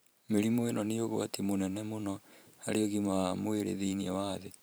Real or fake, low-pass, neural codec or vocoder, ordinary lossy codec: fake; none; vocoder, 44.1 kHz, 128 mel bands every 512 samples, BigVGAN v2; none